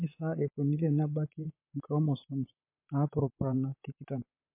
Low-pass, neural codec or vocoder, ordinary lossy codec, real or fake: 3.6 kHz; vocoder, 44.1 kHz, 128 mel bands, Pupu-Vocoder; MP3, 24 kbps; fake